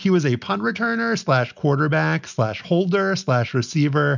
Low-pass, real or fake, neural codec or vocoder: 7.2 kHz; real; none